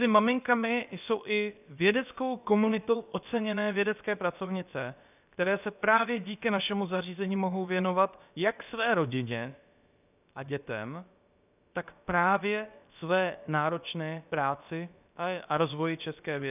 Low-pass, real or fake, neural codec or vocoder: 3.6 kHz; fake; codec, 16 kHz, about 1 kbps, DyCAST, with the encoder's durations